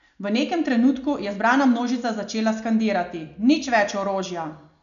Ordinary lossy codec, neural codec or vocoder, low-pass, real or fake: none; none; 7.2 kHz; real